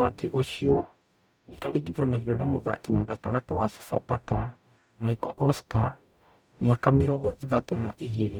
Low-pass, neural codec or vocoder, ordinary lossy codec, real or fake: none; codec, 44.1 kHz, 0.9 kbps, DAC; none; fake